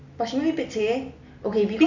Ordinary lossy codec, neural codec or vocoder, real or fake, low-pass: none; none; real; 7.2 kHz